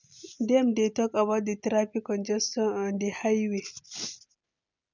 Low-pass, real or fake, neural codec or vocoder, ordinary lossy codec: 7.2 kHz; real; none; none